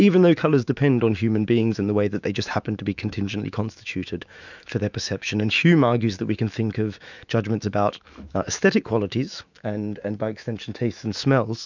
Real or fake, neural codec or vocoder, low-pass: fake; autoencoder, 48 kHz, 128 numbers a frame, DAC-VAE, trained on Japanese speech; 7.2 kHz